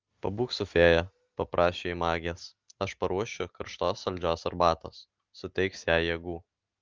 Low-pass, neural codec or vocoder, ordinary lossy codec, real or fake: 7.2 kHz; none; Opus, 32 kbps; real